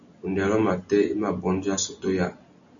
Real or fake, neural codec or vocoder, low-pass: real; none; 7.2 kHz